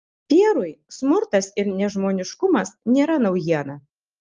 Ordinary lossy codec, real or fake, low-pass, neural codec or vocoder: Opus, 32 kbps; real; 7.2 kHz; none